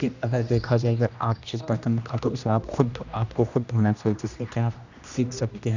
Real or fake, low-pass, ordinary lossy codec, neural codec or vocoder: fake; 7.2 kHz; none; codec, 16 kHz, 1 kbps, X-Codec, HuBERT features, trained on general audio